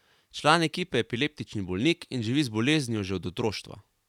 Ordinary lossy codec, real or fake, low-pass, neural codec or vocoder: none; real; 19.8 kHz; none